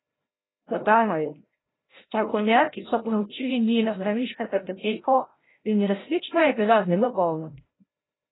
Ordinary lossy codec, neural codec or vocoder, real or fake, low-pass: AAC, 16 kbps; codec, 16 kHz, 0.5 kbps, FreqCodec, larger model; fake; 7.2 kHz